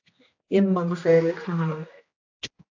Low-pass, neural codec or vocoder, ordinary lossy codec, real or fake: 7.2 kHz; codec, 16 kHz, 1 kbps, X-Codec, HuBERT features, trained on general audio; AAC, 48 kbps; fake